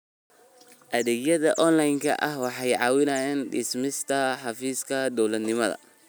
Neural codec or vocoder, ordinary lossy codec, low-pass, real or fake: none; none; none; real